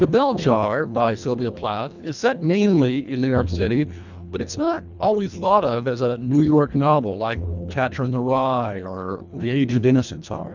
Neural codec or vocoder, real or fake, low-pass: codec, 24 kHz, 1.5 kbps, HILCodec; fake; 7.2 kHz